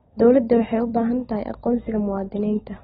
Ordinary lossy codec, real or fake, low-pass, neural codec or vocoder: AAC, 16 kbps; real; 7.2 kHz; none